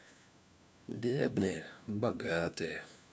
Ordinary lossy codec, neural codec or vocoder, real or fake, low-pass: none; codec, 16 kHz, 1 kbps, FunCodec, trained on LibriTTS, 50 frames a second; fake; none